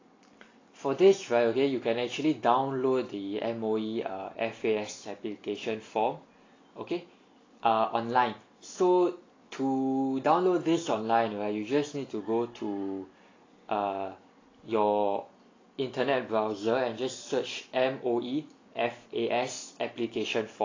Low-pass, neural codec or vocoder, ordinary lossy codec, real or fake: 7.2 kHz; none; AAC, 32 kbps; real